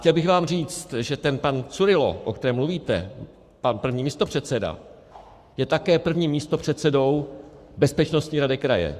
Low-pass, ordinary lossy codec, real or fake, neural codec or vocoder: 14.4 kHz; Opus, 64 kbps; fake; codec, 44.1 kHz, 7.8 kbps, Pupu-Codec